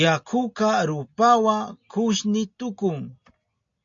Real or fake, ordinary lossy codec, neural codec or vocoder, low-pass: real; AAC, 64 kbps; none; 7.2 kHz